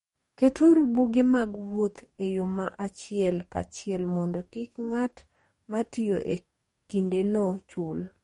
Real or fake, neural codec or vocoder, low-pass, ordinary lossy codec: fake; codec, 44.1 kHz, 2.6 kbps, DAC; 19.8 kHz; MP3, 48 kbps